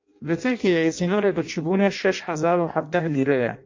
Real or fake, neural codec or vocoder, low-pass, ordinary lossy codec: fake; codec, 16 kHz in and 24 kHz out, 0.6 kbps, FireRedTTS-2 codec; 7.2 kHz; MP3, 48 kbps